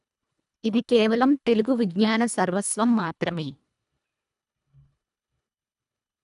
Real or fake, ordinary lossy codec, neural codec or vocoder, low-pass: fake; none; codec, 24 kHz, 1.5 kbps, HILCodec; 10.8 kHz